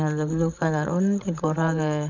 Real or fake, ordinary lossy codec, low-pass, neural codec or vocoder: fake; none; 7.2 kHz; codec, 16 kHz, 16 kbps, FreqCodec, larger model